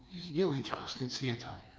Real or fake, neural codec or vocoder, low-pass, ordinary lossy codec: fake; codec, 16 kHz, 2 kbps, FreqCodec, larger model; none; none